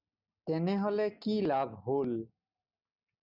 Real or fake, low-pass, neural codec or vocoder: real; 5.4 kHz; none